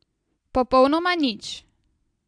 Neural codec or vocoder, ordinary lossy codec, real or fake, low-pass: none; AAC, 64 kbps; real; 9.9 kHz